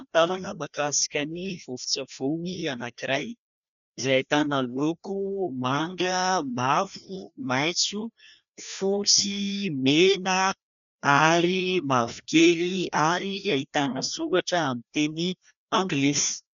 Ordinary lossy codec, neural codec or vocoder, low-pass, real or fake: MP3, 96 kbps; codec, 16 kHz, 1 kbps, FreqCodec, larger model; 7.2 kHz; fake